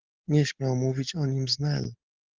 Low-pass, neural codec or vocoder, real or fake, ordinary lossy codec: 7.2 kHz; none; real; Opus, 16 kbps